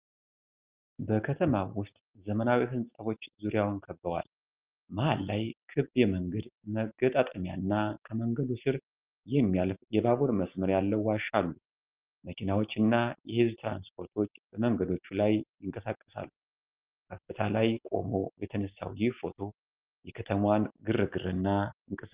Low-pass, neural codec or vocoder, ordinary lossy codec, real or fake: 3.6 kHz; none; Opus, 24 kbps; real